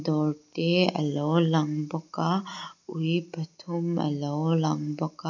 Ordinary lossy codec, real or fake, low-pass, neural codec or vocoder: none; real; 7.2 kHz; none